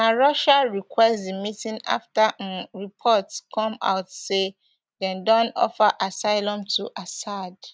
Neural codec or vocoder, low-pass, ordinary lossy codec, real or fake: none; none; none; real